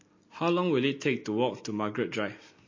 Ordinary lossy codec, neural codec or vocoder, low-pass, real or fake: MP3, 32 kbps; none; 7.2 kHz; real